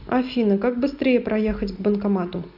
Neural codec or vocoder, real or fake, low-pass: none; real; 5.4 kHz